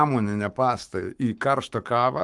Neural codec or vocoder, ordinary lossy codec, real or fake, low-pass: codec, 44.1 kHz, 7.8 kbps, Pupu-Codec; Opus, 32 kbps; fake; 10.8 kHz